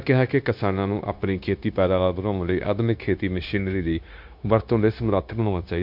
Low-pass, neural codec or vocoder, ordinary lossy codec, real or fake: 5.4 kHz; codec, 16 kHz, 0.9 kbps, LongCat-Audio-Codec; MP3, 48 kbps; fake